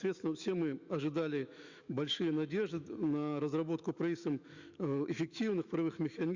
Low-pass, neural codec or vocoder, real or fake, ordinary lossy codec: 7.2 kHz; autoencoder, 48 kHz, 128 numbers a frame, DAC-VAE, trained on Japanese speech; fake; Opus, 64 kbps